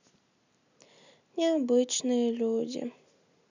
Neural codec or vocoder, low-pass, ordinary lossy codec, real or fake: none; 7.2 kHz; none; real